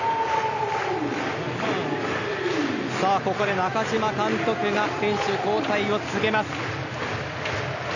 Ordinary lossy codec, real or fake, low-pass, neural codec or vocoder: none; real; 7.2 kHz; none